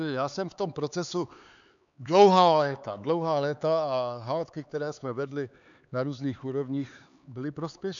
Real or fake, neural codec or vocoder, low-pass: fake; codec, 16 kHz, 4 kbps, X-Codec, HuBERT features, trained on LibriSpeech; 7.2 kHz